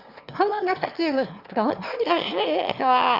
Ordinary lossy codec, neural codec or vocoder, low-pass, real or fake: Opus, 64 kbps; autoencoder, 22.05 kHz, a latent of 192 numbers a frame, VITS, trained on one speaker; 5.4 kHz; fake